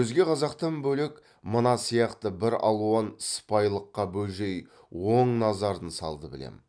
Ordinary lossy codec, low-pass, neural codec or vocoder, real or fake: none; 9.9 kHz; none; real